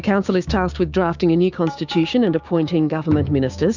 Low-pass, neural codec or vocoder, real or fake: 7.2 kHz; codec, 16 kHz, 6 kbps, DAC; fake